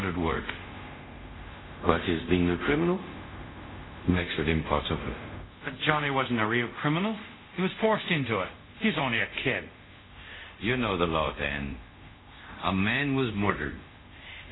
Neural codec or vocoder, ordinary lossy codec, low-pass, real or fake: codec, 24 kHz, 0.5 kbps, DualCodec; AAC, 16 kbps; 7.2 kHz; fake